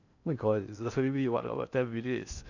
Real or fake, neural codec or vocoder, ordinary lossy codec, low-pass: fake; codec, 16 kHz in and 24 kHz out, 0.6 kbps, FocalCodec, streaming, 4096 codes; none; 7.2 kHz